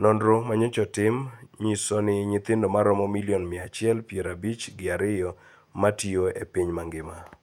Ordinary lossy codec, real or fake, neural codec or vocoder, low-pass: none; fake; vocoder, 48 kHz, 128 mel bands, Vocos; 19.8 kHz